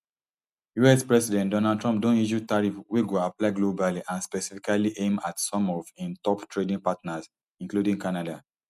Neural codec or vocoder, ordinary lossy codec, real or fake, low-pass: none; none; real; 14.4 kHz